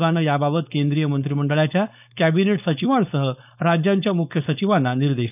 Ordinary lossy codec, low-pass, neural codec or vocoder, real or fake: none; 3.6 kHz; codec, 16 kHz, 4.8 kbps, FACodec; fake